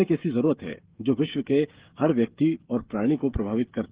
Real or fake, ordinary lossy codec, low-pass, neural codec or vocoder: fake; Opus, 16 kbps; 3.6 kHz; codec, 16 kHz, 16 kbps, FreqCodec, smaller model